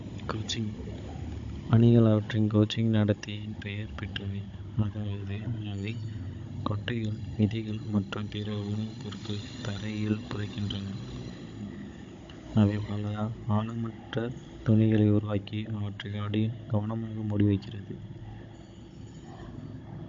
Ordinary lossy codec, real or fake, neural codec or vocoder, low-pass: MP3, 48 kbps; fake; codec, 16 kHz, 16 kbps, FunCodec, trained on Chinese and English, 50 frames a second; 7.2 kHz